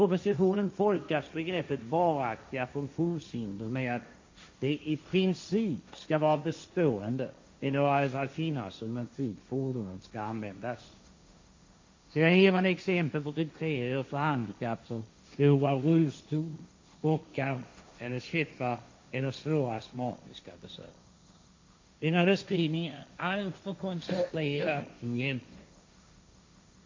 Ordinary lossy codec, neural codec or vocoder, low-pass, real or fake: MP3, 48 kbps; codec, 16 kHz, 1.1 kbps, Voila-Tokenizer; 7.2 kHz; fake